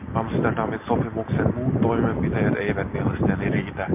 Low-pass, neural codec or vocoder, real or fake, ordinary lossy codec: 3.6 kHz; none; real; MP3, 32 kbps